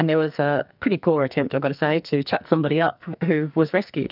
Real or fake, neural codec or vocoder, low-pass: fake; codec, 44.1 kHz, 2.6 kbps, SNAC; 5.4 kHz